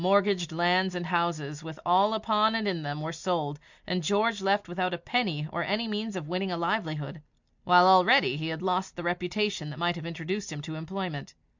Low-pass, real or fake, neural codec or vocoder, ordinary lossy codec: 7.2 kHz; real; none; MP3, 64 kbps